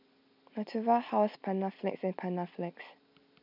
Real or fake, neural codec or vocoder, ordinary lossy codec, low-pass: real; none; none; 5.4 kHz